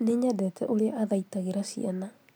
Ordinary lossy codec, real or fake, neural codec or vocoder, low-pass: none; real; none; none